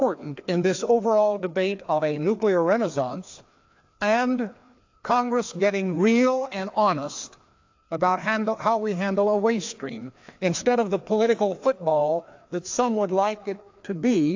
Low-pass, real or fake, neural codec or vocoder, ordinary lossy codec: 7.2 kHz; fake; codec, 16 kHz, 2 kbps, FreqCodec, larger model; AAC, 48 kbps